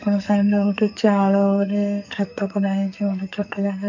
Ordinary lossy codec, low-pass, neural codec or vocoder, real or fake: none; 7.2 kHz; codec, 44.1 kHz, 2.6 kbps, SNAC; fake